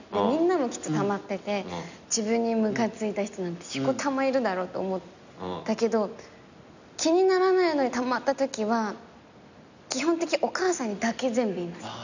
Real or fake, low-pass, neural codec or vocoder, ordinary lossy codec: real; 7.2 kHz; none; none